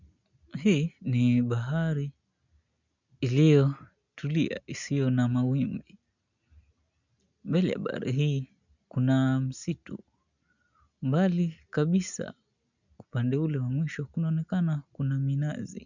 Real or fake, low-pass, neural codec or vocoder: real; 7.2 kHz; none